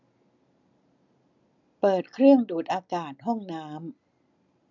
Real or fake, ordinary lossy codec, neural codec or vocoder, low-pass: real; none; none; 7.2 kHz